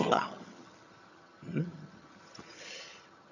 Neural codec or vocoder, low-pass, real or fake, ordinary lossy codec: vocoder, 22.05 kHz, 80 mel bands, HiFi-GAN; 7.2 kHz; fake; none